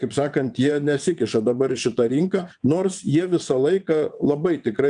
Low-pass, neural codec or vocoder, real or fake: 9.9 kHz; vocoder, 22.05 kHz, 80 mel bands, WaveNeXt; fake